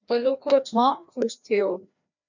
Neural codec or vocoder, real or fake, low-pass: codec, 16 kHz, 1 kbps, FreqCodec, larger model; fake; 7.2 kHz